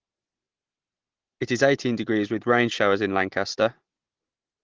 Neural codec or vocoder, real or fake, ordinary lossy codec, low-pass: none; real; Opus, 16 kbps; 7.2 kHz